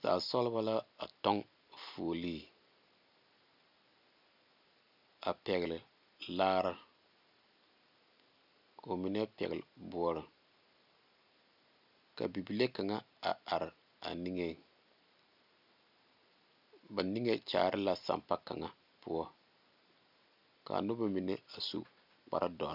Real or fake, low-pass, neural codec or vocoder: real; 5.4 kHz; none